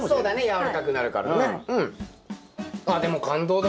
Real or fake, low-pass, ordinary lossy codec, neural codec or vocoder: real; none; none; none